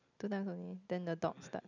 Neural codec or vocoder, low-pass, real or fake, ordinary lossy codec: none; 7.2 kHz; real; none